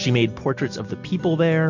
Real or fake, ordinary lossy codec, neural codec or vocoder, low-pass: real; MP3, 48 kbps; none; 7.2 kHz